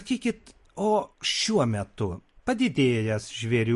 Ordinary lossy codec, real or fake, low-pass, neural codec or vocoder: MP3, 48 kbps; real; 14.4 kHz; none